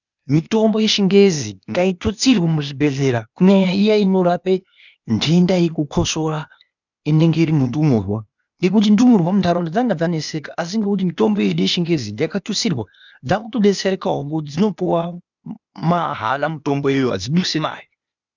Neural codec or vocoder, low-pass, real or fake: codec, 16 kHz, 0.8 kbps, ZipCodec; 7.2 kHz; fake